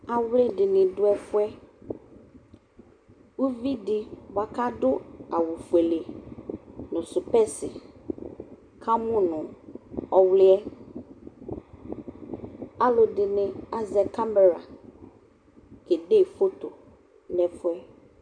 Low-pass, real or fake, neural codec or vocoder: 9.9 kHz; real; none